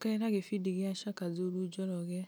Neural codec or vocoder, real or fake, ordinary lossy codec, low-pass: none; real; none; none